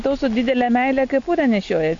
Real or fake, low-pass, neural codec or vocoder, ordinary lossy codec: real; 7.2 kHz; none; AAC, 48 kbps